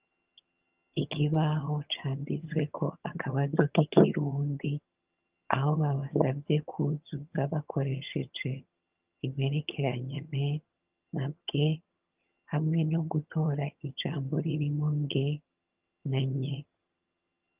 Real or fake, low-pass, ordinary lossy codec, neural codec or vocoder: fake; 3.6 kHz; Opus, 32 kbps; vocoder, 22.05 kHz, 80 mel bands, HiFi-GAN